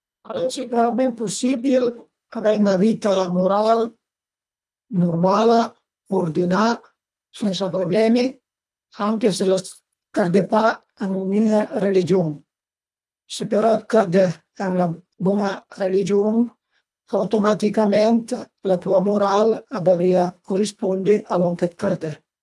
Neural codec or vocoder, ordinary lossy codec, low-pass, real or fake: codec, 24 kHz, 1.5 kbps, HILCodec; none; none; fake